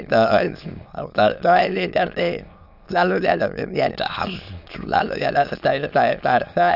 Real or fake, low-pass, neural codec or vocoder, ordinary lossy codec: fake; 5.4 kHz; autoencoder, 22.05 kHz, a latent of 192 numbers a frame, VITS, trained on many speakers; AAC, 48 kbps